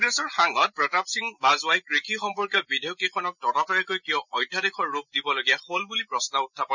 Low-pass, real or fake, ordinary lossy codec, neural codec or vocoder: 7.2 kHz; real; none; none